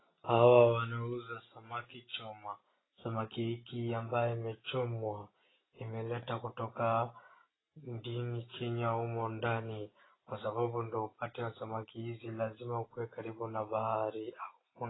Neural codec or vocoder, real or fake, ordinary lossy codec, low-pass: none; real; AAC, 16 kbps; 7.2 kHz